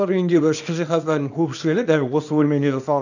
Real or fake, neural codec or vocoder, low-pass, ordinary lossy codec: fake; codec, 24 kHz, 0.9 kbps, WavTokenizer, small release; 7.2 kHz; none